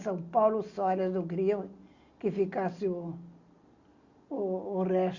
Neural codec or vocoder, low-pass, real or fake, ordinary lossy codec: none; 7.2 kHz; real; Opus, 64 kbps